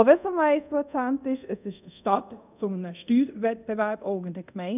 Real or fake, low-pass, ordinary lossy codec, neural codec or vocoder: fake; 3.6 kHz; none; codec, 24 kHz, 0.5 kbps, DualCodec